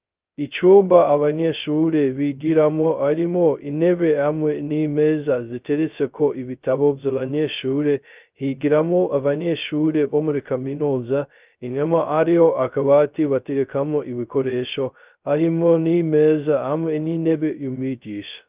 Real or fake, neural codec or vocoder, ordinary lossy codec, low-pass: fake; codec, 16 kHz, 0.2 kbps, FocalCodec; Opus, 24 kbps; 3.6 kHz